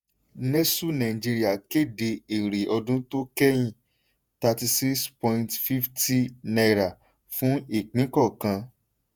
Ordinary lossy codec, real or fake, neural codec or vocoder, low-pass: none; fake; vocoder, 48 kHz, 128 mel bands, Vocos; none